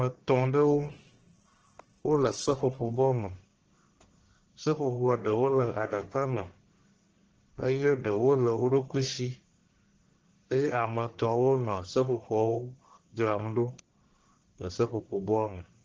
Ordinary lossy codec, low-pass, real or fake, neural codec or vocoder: Opus, 16 kbps; 7.2 kHz; fake; codec, 44.1 kHz, 1.7 kbps, Pupu-Codec